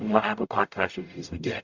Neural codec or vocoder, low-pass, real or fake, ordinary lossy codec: codec, 44.1 kHz, 0.9 kbps, DAC; 7.2 kHz; fake; Opus, 64 kbps